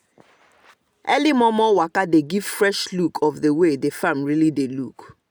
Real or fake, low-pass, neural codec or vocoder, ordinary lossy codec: real; none; none; none